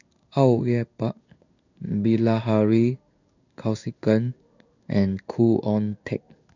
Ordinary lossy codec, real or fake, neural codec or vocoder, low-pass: none; fake; codec, 16 kHz in and 24 kHz out, 1 kbps, XY-Tokenizer; 7.2 kHz